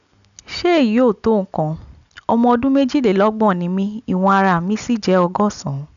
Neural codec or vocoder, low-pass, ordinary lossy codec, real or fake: none; 7.2 kHz; none; real